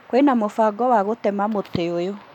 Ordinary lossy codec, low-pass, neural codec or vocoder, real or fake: none; 19.8 kHz; none; real